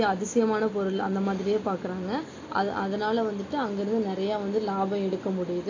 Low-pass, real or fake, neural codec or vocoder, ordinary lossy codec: 7.2 kHz; real; none; AAC, 32 kbps